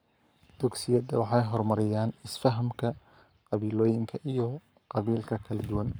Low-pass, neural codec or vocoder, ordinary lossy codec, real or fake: none; codec, 44.1 kHz, 7.8 kbps, Pupu-Codec; none; fake